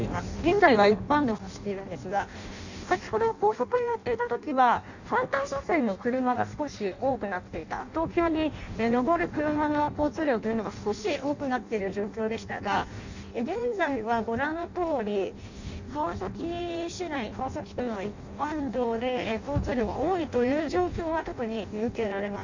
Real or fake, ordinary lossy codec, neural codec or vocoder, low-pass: fake; none; codec, 16 kHz in and 24 kHz out, 0.6 kbps, FireRedTTS-2 codec; 7.2 kHz